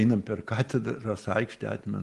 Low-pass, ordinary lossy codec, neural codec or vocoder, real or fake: 10.8 kHz; Opus, 24 kbps; none; real